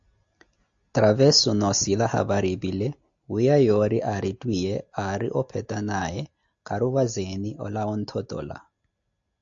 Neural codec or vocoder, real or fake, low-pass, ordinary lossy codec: none; real; 7.2 kHz; AAC, 64 kbps